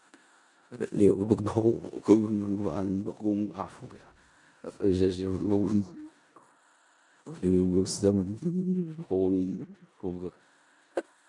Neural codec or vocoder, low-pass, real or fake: codec, 16 kHz in and 24 kHz out, 0.4 kbps, LongCat-Audio-Codec, four codebook decoder; 10.8 kHz; fake